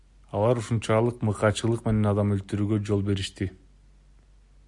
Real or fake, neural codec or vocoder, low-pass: real; none; 10.8 kHz